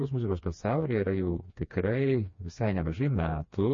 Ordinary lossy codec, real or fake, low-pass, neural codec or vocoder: MP3, 32 kbps; fake; 7.2 kHz; codec, 16 kHz, 2 kbps, FreqCodec, smaller model